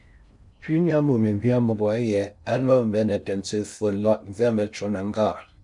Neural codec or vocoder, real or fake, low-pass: codec, 16 kHz in and 24 kHz out, 0.6 kbps, FocalCodec, streaming, 4096 codes; fake; 10.8 kHz